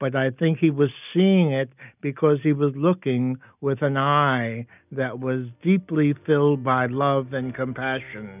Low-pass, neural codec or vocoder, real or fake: 3.6 kHz; none; real